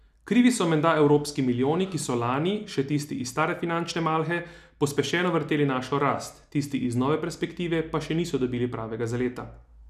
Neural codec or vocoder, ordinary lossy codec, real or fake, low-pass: none; none; real; 14.4 kHz